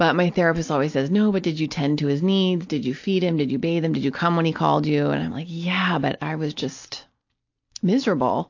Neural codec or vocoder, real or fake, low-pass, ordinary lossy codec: none; real; 7.2 kHz; AAC, 48 kbps